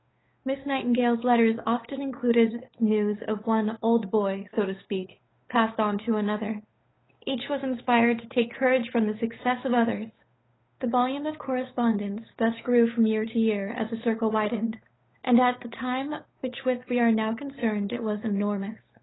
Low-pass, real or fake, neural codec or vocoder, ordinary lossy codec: 7.2 kHz; fake; codec, 16 kHz, 8 kbps, FunCodec, trained on Chinese and English, 25 frames a second; AAC, 16 kbps